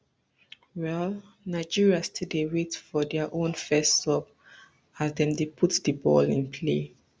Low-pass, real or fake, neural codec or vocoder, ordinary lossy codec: none; real; none; none